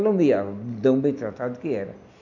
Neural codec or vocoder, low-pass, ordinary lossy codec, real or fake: none; 7.2 kHz; none; real